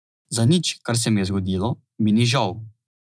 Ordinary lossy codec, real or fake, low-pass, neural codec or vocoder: none; real; none; none